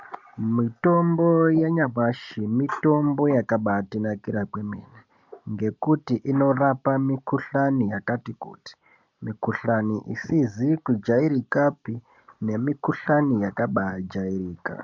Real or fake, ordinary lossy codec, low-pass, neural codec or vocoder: real; MP3, 64 kbps; 7.2 kHz; none